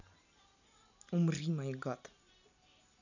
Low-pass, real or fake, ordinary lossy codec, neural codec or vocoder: 7.2 kHz; real; none; none